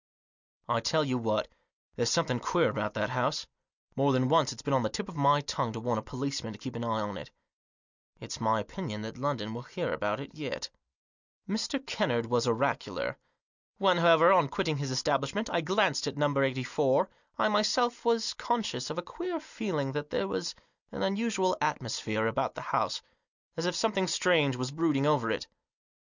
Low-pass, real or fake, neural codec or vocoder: 7.2 kHz; real; none